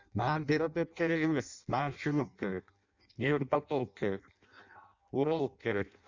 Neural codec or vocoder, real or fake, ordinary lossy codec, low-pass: codec, 16 kHz in and 24 kHz out, 0.6 kbps, FireRedTTS-2 codec; fake; Opus, 64 kbps; 7.2 kHz